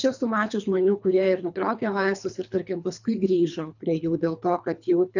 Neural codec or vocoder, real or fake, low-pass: codec, 24 kHz, 3 kbps, HILCodec; fake; 7.2 kHz